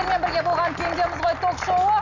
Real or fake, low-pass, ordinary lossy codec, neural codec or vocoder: real; 7.2 kHz; none; none